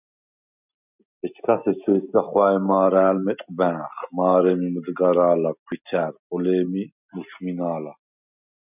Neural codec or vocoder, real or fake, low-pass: none; real; 3.6 kHz